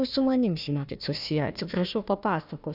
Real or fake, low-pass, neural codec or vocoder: fake; 5.4 kHz; codec, 16 kHz, 1 kbps, FunCodec, trained on Chinese and English, 50 frames a second